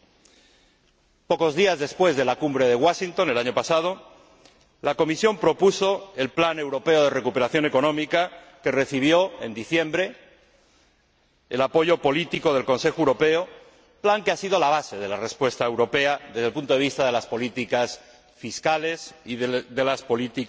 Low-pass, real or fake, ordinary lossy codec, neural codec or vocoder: none; real; none; none